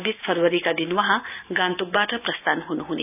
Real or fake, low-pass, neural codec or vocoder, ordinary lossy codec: real; 3.6 kHz; none; none